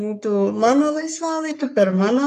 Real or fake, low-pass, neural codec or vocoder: fake; 14.4 kHz; codec, 44.1 kHz, 3.4 kbps, Pupu-Codec